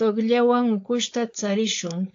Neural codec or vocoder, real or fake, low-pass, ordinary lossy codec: codec, 16 kHz, 4.8 kbps, FACodec; fake; 7.2 kHz; AAC, 48 kbps